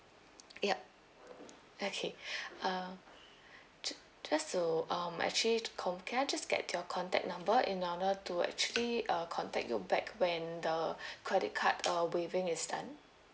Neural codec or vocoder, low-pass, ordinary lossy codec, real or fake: none; none; none; real